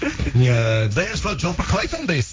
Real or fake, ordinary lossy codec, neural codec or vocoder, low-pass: fake; none; codec, 16 kHz, 1.1 kbps, Voila-Tokenizer; none